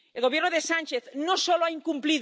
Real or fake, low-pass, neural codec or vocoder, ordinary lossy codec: real; none; none; none